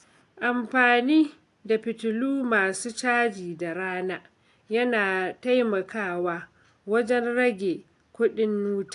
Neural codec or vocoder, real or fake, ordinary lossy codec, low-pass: none; real; AAC, 64 kbps; 10.8 kHz